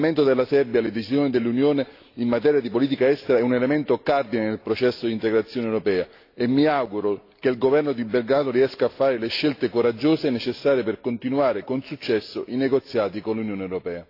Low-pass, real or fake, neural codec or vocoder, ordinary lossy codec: 5.4 kHz; real; none; AAC, 32 kbps